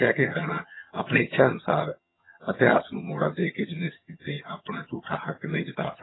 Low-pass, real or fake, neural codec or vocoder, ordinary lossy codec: 7.2 kHz; fake; vocoder, 22.05 kHz, 80 mel bands, HiFi-GAN; AAC, 16 kbps